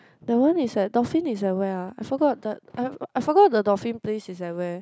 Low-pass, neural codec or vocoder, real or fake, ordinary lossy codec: none; none; real; none